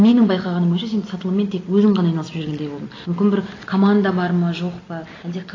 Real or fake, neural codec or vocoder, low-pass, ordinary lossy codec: real; none; 7.2 kHz; AAC, 32 kbps